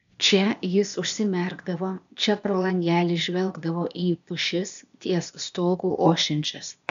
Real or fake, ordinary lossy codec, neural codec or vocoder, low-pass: fake; AAC, 96 kbps; codec, 16 kHz, 0.8 kbps, ZipCodec; 7.2 kHz